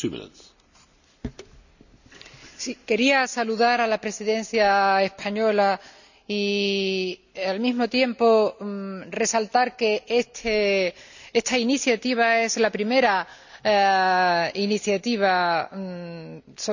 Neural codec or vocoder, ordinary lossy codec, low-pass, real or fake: none; none; 7.2 kHz; real